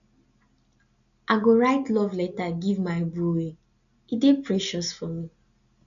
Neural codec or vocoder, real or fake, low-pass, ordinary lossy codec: none; real; 7.2 kHz; none